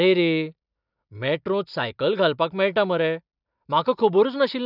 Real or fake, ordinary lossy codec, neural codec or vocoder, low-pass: real; none; none; 5.4 kHz